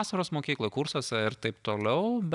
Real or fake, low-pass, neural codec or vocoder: real; 10.8 kHz; none